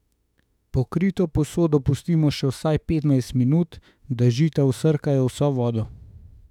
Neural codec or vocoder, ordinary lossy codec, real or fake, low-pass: autoencoder, 48 kHz, 32 numbers a frame, DAC-VAE, trained on Japanese speech; none; fake; 19.8 kHz